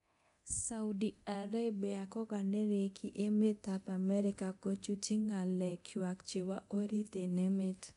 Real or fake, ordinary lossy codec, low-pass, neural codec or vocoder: fake; none; 10.8 kHz; codec, 24 kHz, 0.9 kbps, DualCodec